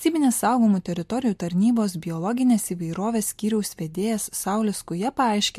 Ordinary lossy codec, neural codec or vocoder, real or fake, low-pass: MP3, 64 kbps; none; real; 14.4 kHz